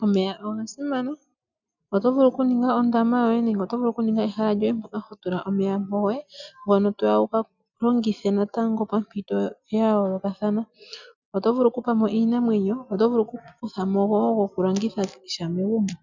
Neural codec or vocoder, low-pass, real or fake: none; 7.2 kHz; real